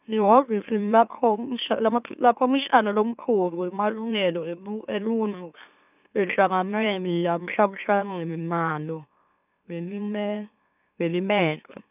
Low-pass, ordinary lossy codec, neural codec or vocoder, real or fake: 3.6 kHz; none; autoencoder, 44.1 kHz, a latent of 192 numbers a frame, MeloTTS; fake